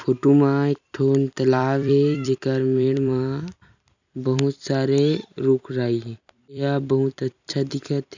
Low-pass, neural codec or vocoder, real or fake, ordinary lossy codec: 7.2 kHz; vocoder, 44.1 kHz, 128 mel bands every 256 samples, BigVGAN v2; fake; none